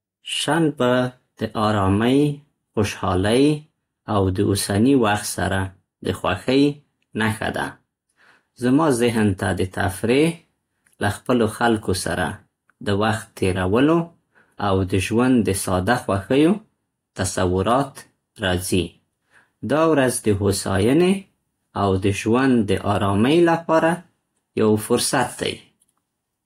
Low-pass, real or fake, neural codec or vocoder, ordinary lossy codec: 19.8 kHz; real; none; AAC, 48 kbps